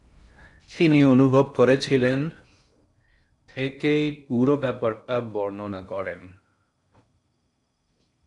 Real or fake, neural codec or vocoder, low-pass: fake; codec, 16 kHz in and 24 kHz out, 0.6 kbps, FocalCodec, streaming, 4096 codes; 10.8 kHz